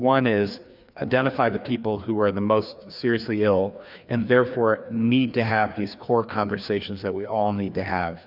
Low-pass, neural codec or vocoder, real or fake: 5.4 kHz; codec, 16 kHz, 2 kbps, FreqCodec, larger model; fake